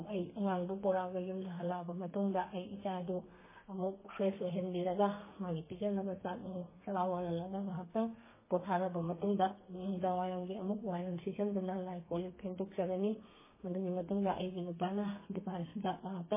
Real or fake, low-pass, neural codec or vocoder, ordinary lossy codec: fake; 3.6 kHz; codec, 32 kHz, 1.9 kbps, SNAC; MP3, 16 kbps